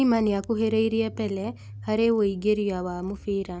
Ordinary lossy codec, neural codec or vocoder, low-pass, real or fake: none; none; none; real